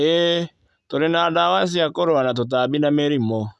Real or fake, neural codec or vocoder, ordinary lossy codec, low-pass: real; none; none; none